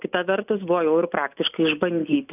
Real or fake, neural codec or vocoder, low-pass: real; none; 3.6 kHz